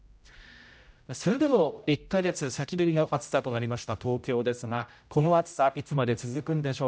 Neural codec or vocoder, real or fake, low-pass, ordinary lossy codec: codec, 16 kHz, 0.5 kbps, X-Codec, HuBERT features, trained on general audio; fake; none; none